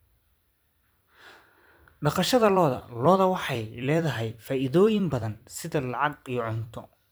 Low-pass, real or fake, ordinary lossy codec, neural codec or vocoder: none; fake; none; vocoder, 44.1 kHz, 128 mel bands every 256 samples, BigVGAN v2